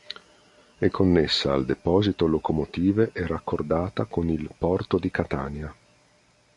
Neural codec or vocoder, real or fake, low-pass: none; real; 10.8 kHz